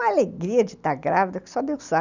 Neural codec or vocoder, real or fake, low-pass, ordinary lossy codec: none; real; 7.2 kHz; none